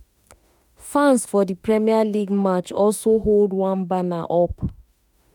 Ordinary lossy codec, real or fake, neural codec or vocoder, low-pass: none; fake; autoencoder, 48 kHz, 32 numbers a frame, DAC-VAE, trained on Japanese speech; 19.8 kHz